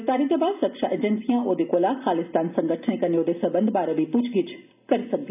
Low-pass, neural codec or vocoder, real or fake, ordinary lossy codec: 3.6 kHz; none; real; none